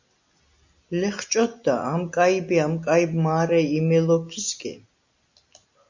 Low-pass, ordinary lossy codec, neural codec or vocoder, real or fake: 7.2 kHz; MP3, 64 kbps; none; real